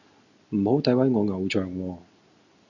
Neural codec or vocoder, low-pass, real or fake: none; 7.2 kHz; real